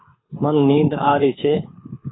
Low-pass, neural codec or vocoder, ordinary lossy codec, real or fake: 7.2 kHz; codec, 24 kHz, 6 kbps, HILCodec; AAC, 16 kbps; fake